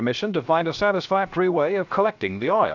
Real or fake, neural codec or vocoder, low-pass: fake; codec, 16 kHz, 0.7 kbps, FocalCodec; 7.2 kHz